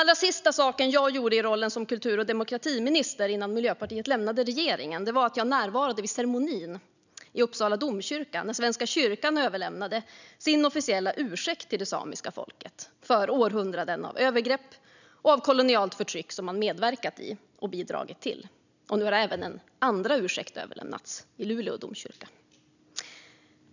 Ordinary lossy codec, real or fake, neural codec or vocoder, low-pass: none; real; none; 7.2 kHz